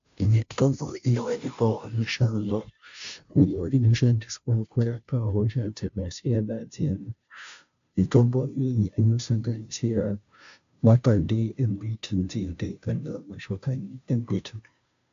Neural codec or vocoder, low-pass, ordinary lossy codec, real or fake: codec, 16 kHz, 0.5 kbps, FunCodec, trained on Chinese and English, 25 frames a second; 7.2 kHz; AAC, 64 kbps; fake